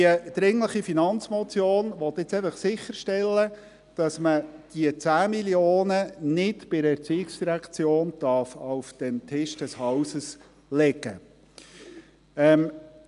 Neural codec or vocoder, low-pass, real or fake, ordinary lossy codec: none; 10.8 kHz; real; none